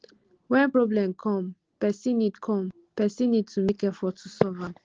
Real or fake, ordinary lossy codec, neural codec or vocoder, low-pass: real; Opus, 16 kbps; none; 7.2 kHz